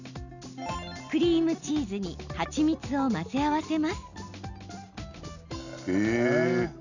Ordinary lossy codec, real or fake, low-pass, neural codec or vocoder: none; real; 7.2 kHz; none